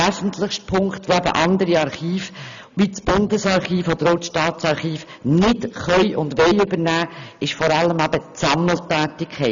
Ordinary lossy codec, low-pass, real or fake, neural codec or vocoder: MP3, 96 kbps; 7.2 kHz; real; none